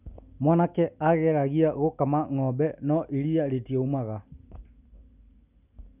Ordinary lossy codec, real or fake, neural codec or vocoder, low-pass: none; real; none; 3.6 kHz